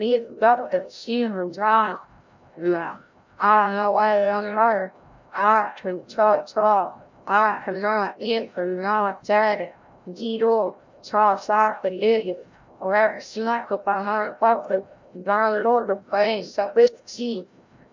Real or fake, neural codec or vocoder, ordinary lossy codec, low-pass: fake; codec, 16 kHz, 0.5 kbps, FreqCodec, larger model; MP3, 64 kbps; 7.2 kHz